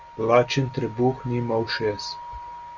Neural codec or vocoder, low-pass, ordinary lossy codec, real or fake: none; 7.2 kHz; Opus, 64 kbps; real